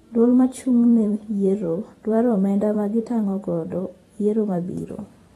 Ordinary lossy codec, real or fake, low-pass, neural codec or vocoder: AAC, 32 kbps; fake; 19.8 kHz; vocoder, 44.1 kHz, 128 mel bands every 512 samples, BigVGAN v2